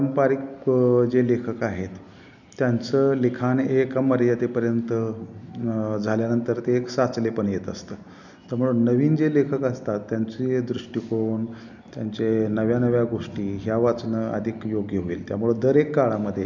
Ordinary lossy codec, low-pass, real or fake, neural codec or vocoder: none; 7.2 kHz; real; none